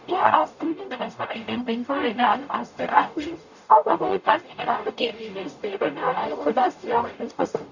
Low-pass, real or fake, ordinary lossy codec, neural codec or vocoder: 7.2 kHz; fake; none; codec, 44.1 kHz, 0.9 kbps, DAC